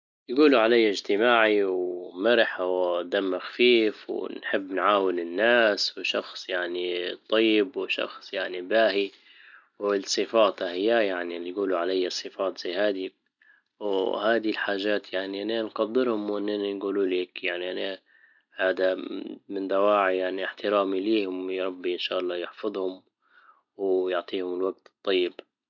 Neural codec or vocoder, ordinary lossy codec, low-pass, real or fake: none; none; 7.2 kHz; real